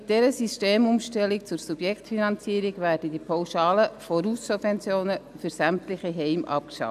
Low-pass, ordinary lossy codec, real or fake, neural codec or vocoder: 14.4 kHz; none; real; none